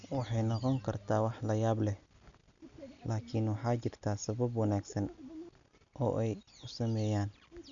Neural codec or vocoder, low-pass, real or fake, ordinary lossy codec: none; 7.2 kHz; real; MP3, 96 kbps